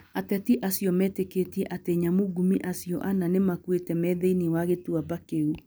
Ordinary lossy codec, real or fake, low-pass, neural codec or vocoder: none; real; none; none